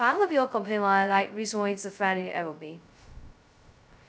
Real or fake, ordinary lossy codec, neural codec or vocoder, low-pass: fake; none; codec, 16 kHz, 0.2 kbps, FocalCodec; none